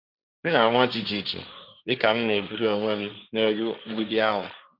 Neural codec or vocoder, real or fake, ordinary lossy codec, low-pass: codec, 16 kHz, 1.1 kbps, Voila-Tokenizer; fake; none; 5.4 kHz